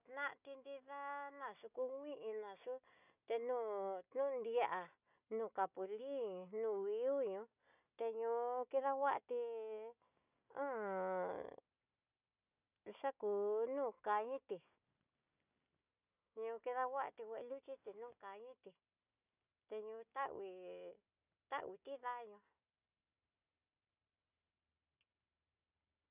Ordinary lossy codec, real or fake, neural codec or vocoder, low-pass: none; real; none; 3.6 kHz